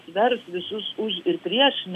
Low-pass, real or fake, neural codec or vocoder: 14.4 kHz; real; none